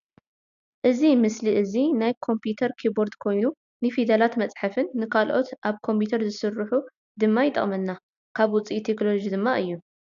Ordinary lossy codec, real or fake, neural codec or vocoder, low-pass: AAC, 96 kbps; real; none; 7.2 kHz